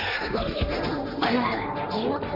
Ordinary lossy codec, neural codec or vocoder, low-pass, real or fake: none; codec, 16 kHz, 1.1 kbps, Voila-Tokenizer; 5.4 kHz; fake